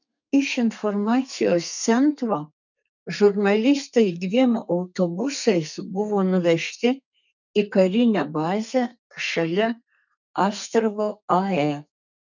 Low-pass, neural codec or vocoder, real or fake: 7.2 kHz; codec, 32 kHz, 1.9 kbps, SNAC; fake